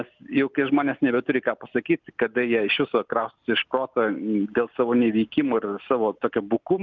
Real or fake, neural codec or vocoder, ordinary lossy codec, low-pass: real; none; Opus, 24 kbps; 7.2 kHz